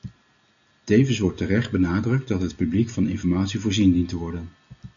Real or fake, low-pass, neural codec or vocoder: real; 7.2 kHz; none